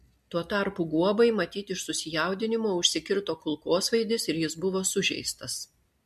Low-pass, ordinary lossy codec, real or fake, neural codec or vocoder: 14.4 kHz; MP3, 64 kbps; real; none